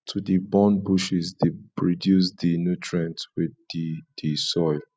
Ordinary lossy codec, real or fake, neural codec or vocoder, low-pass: none; real; none; none